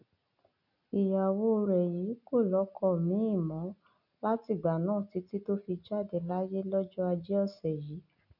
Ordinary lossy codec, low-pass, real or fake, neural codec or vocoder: none; 5.4 kHz; real; none